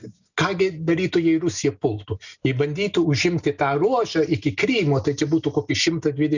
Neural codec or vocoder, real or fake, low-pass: none; real; 7.2 kHz